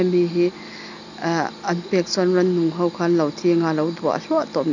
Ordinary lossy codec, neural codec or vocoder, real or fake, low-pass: none; none; real; 7.2 kHz